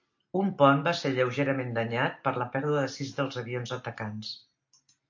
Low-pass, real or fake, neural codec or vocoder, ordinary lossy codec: 7.2 kHz; real; none; AAC, 48 kbps